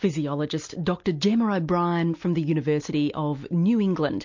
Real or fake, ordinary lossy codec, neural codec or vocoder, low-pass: real; MP3, 48 kbps; none; 7.2 kHz